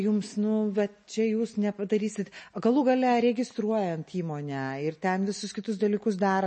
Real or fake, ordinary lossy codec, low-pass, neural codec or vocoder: real; MP3, 32 kbps; 10.8 kHz; none